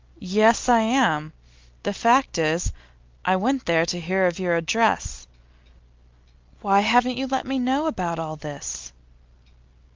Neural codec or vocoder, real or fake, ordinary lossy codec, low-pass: none; real; Opus, 24 kbps; 7.2 kHz